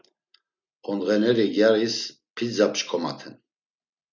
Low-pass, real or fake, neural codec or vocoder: 7.2 kHz; real; none